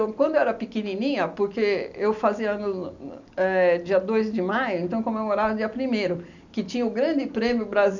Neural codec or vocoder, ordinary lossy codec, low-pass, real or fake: none; none; 7.2 kHz; real